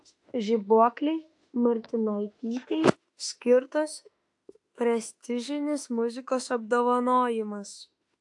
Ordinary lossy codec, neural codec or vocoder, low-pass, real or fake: AAC, 64 kbps; autoencoder, 48 kHz, 32 numbers a frame, DAC-VAE, trained on Japanese speech; 10.8 kHz; fake